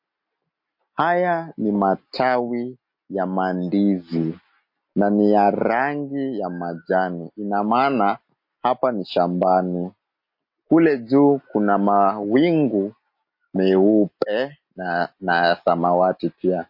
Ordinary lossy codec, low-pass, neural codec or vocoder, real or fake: MP3, 32 kbps; 5.4 kHz; none; real